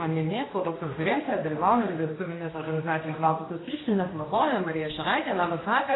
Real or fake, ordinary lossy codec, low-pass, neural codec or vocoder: fake; AAC, 16 kbps; 7.2 kHz; codec, 16 kHz, 1 kbps, X-Codec, HuBERT features, trained on general audio